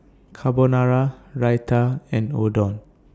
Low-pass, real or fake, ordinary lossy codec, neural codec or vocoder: none; real; none; none